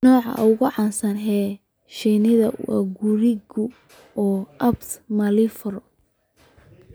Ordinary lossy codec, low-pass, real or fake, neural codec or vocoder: none; none; real; none